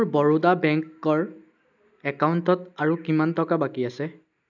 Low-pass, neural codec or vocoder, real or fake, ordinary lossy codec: 7.2 kHz; none; real; none